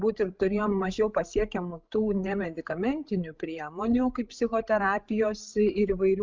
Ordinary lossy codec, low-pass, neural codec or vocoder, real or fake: Opus, 32 kbps; 7.2 kHz; codec, 16 kHz, 16 kbps, FreqCodec, larger model; fake